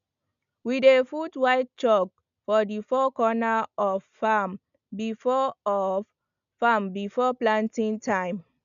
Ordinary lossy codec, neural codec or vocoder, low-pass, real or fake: none; none; 7.2 kHz; real